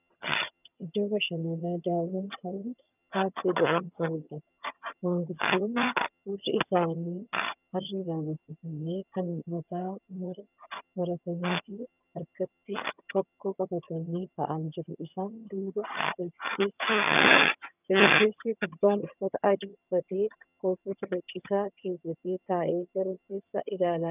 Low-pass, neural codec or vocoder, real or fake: 3.6 kHz; vocoder, 22.05 kHz, 80 mel bands, HiFi-GAN; fake